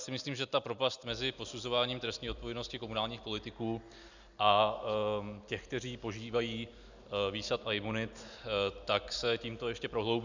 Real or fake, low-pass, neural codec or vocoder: real; 7.2 kHz; none